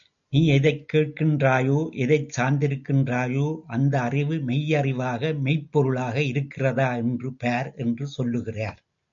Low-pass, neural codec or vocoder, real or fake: 7.2 kHz; none; real